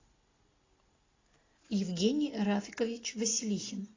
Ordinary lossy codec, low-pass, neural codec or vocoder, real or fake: AAC, 32 kbps; 7.2 kHz; none; real